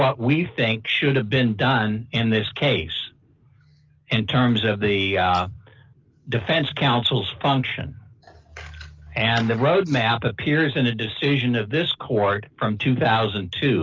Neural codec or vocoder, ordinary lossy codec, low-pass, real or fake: none; Opus, 32 kbps; 7.2 kHz; real